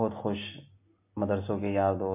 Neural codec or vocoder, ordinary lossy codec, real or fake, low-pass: none; MP3, 24 kbps; real; 3.6 kHz